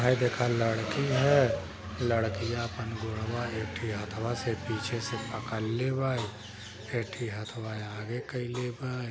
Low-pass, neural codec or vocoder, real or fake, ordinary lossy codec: none; none; real; none